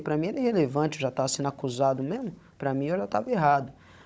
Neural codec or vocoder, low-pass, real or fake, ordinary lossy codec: codec, 16 kHz, 16 kbps, FunCodec, trained on Chinese and English, 50 frames a second; none; fake; none